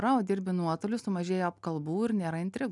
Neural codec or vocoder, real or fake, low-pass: none; real; 10.8 kHz